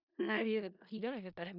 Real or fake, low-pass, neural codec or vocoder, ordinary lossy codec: fake; 5.4 kHz; codec, 16 kHz in and 24 kHz out, 0.4 kbps, LongCat-Audio-Codec, four codebook decoder; none